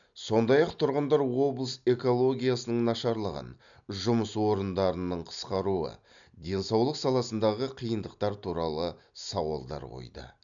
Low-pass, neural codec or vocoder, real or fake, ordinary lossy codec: 7.2 kHz; none; real; none